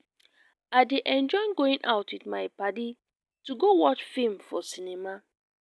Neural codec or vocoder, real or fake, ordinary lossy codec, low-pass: none; real; none; none